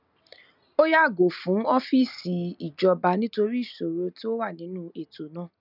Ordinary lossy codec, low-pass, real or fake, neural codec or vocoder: none; 5.4 kHz; real; none